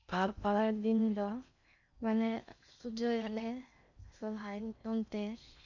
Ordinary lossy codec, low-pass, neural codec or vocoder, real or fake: none; 7.2 kHz; codec, 16 kHz in and 24 kHz out, 0.6 kbps, FocalCodec, streaming, 2048 codes; fake